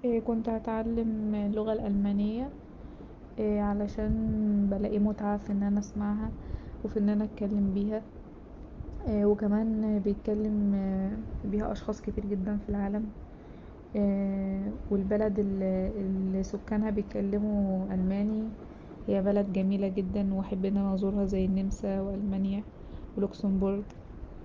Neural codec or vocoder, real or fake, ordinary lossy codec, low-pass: none; real; Opus, 32 kbps; 7.2 kHz